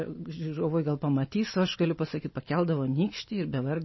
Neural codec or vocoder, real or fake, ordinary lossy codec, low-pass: none; real; MP3, 24 kbps; 7.2 kHz